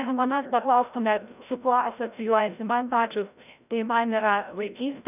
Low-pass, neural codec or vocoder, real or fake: 3.6 kHz; codec, 16 kHz, 0.5 kbps, FreqCodec, larger model; fake